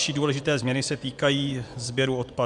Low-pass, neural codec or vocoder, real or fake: 10.8 kHz; vocoder, 24 kHz, 100 mel bands, Vocos; fake